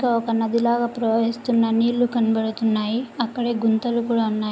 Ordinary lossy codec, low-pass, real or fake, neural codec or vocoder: none; none; real; none